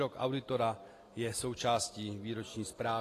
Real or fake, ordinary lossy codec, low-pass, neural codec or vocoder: real; AAC, 48 kbps; 14.4 kHz; none